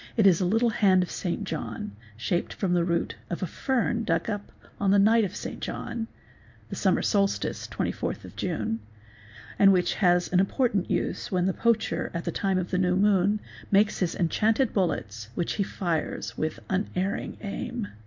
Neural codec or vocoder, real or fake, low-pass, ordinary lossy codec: none; real; 7.2 kHz; MP3, 48 kbps